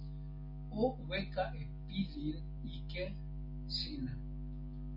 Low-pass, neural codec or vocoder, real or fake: 5.4 kHz; none; real